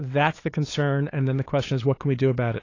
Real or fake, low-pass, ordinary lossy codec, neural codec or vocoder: fake; 7.2 kHz; AAC, 32 kbps; codec, 16 kHz, 8 kbps, FunCodec, trained on LibriTTS, 25 frames a second